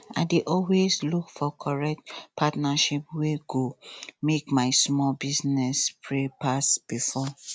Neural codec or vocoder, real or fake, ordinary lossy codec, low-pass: none; real; none; none